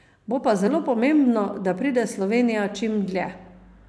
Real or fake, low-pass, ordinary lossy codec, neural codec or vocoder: real; none; none; none